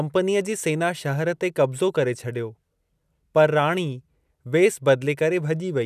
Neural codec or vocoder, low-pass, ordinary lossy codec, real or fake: none; 14.4 kHz; none; real